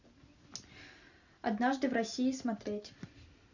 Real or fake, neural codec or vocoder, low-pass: real; none; 7.2 kHz